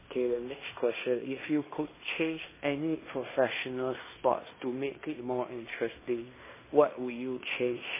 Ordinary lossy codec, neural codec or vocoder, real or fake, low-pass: MP3, 16 kbps; codec, 16 kHz in and 24 kHz out, 0.9 kbps, LongCat-Audio-Codec, fine tuned four codebook decoder; fake; 3.6 kHz